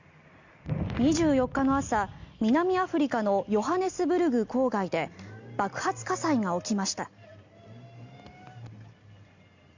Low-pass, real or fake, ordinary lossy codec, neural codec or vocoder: 7.2 kHz; real; Opus, 64 kbps; none